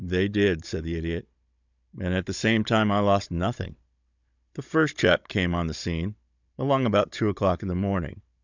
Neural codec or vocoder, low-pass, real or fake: codec, 16 kHz, 16 kbps, FunCodec, trained on Chinese and English, 50 frames a second; 7.2 kHz; fake